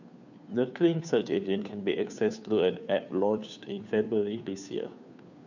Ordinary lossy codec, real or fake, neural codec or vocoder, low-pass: none; fake; codec, 16 kHz, 2 kbps, FunCodec, trained on Chinese and English, 25 frames a second; 7.2 kHz